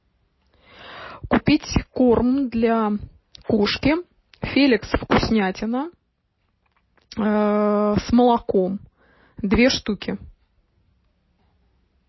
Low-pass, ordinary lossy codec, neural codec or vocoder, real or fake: 7.2 kHz; MP3, 24 kbps; none; real